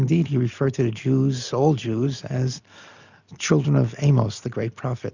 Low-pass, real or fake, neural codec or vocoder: 7.2 kHz; real; none